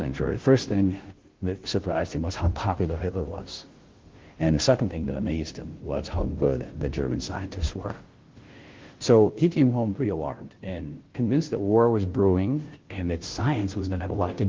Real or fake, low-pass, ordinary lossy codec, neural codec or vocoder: fake; 7.2 kHz; Opus, 16 kbps; codec, 16 kHz, 0.5 kbps, FunCodec, trained on Chinese and English, 25 frames a second